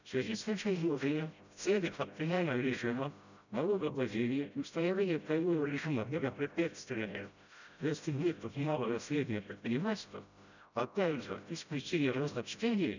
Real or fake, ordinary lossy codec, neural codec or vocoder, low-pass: fake; none; codec, 16 kHz, 0.5 kbps, FreqCodec, smaller model; 7.2 kHz